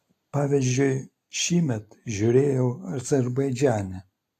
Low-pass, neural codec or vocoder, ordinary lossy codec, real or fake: 14.4 kHz; none; AAC, 64 kbps; real